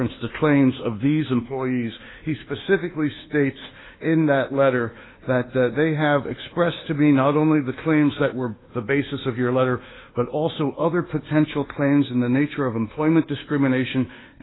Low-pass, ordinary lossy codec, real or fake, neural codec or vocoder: 7.2 kHz; AAC, 16 kbps; fake; codec, 24 kHz, 1.2 kbps, DualCodec